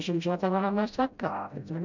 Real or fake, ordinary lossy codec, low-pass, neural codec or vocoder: fake; none; 7.2 kHz; codec, 16 kHz, 0.5 kbps, FreqCodec, smaller model